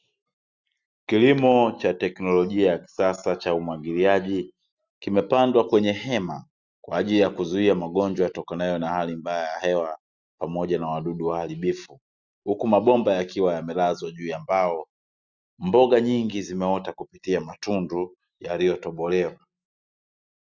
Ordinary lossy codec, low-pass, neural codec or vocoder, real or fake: Opus, 64 kbps; 7.2 kHz; none; real